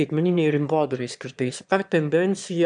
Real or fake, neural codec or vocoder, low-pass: fake; autoencoder, 22.05 kHz, a latent of 192 numbers a frame, VITS, trained on one speaker; 9.9 kHz